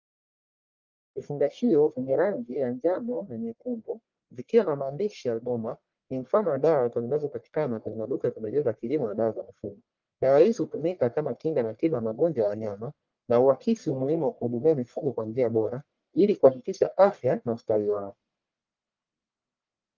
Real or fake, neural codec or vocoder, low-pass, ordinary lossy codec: fake; codec, 44.1 kHz, 1.7 kbps, Pupu-Codec; 7.2 kHz; Opus, 24 kbps